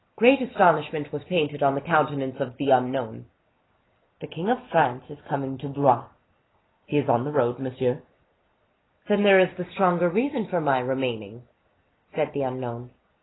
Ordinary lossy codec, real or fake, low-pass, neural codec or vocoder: AAC, 16 kbps; real; 7.2 kHz; none